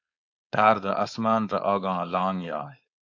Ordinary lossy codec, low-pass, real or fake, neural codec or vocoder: AAC, 48 kbps; 7.2 kHz; fake; codec, 16 kHz, 4.8 kbps, FACodec